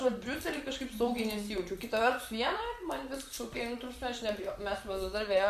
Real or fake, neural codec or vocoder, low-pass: fake; vocoder, 44.1 kHz, 128 mel bands, Pupu-Vocoder; 14.4 kHz